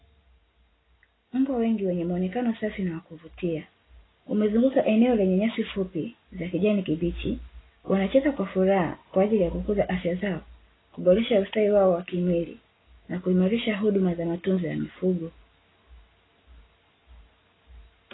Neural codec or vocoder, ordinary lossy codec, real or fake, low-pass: none; AAC, 16 kbps; real; 7.2 kHz